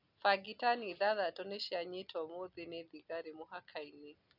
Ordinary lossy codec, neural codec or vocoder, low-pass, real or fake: none; none; 5.4 kHz; real